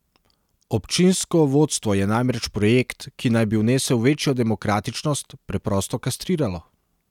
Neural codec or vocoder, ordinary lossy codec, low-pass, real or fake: none; none; 19.8 kHz; real